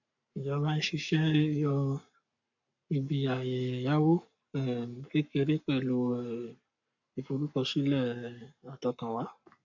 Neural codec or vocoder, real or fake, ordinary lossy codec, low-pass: codec, 44.1 kHz, 7.8 kbps, Pupu-Codec; fake; none; 7.2 kHz